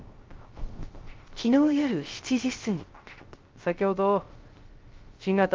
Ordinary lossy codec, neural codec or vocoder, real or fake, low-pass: Opus, 32 kbps; codec, 16 kHz, 0.3 kbps, FocalCodec; fake; 7.2 kHz